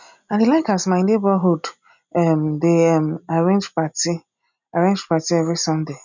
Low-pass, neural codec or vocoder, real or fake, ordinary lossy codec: 7.2 kHz; none; real; none